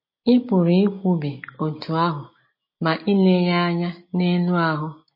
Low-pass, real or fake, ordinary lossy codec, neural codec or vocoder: 5.4 kHz; real; MP3, 24 kbps; none